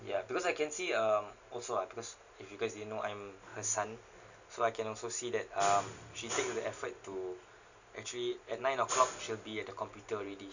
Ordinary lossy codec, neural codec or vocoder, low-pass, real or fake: none; none; 7.2 kHz; real